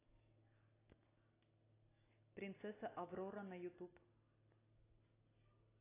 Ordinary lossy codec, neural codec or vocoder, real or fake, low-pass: AAC, 16 kbps; none; real; 3.6 kHz